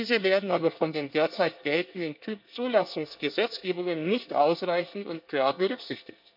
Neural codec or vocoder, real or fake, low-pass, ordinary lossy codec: codec, 24 kHz, 1 kbps, SNAC; fake; 5.4 kHz; AAC, 48 kbps